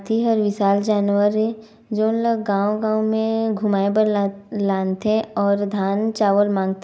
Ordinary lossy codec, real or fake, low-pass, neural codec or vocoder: none; real; none; none